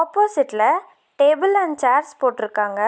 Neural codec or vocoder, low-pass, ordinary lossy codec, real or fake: none; none; none; real